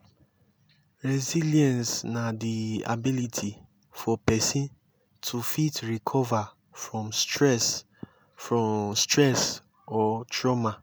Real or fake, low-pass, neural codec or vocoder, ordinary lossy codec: real; none; none; none